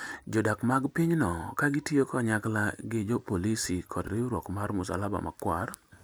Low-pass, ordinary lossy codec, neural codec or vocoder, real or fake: none; none; none; real